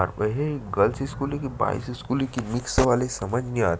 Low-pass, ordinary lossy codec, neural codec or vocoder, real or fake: none; none; none; real